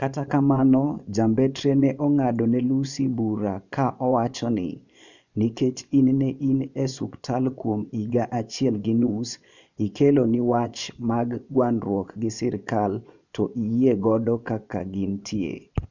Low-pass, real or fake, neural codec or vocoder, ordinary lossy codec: 7.2 kHz; fake; vocoder, 24 kHz, 100 mel bands, Vocos; none